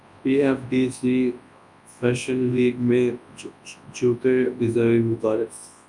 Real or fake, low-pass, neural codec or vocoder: fake; 10.8 kHz; codec, 24 kHz, 0.9 kbps, WavTokenizer, large speech release